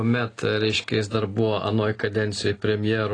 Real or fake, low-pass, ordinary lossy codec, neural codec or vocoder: real; 9.9 kHz; AAC, 32 kbps; none